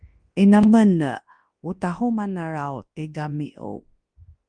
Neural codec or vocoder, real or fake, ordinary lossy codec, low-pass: codec, 24 kHz, 0.9 kbps, WavTokenizer, large speech release; fake; Opus, 24 kbps; 9.9 kHz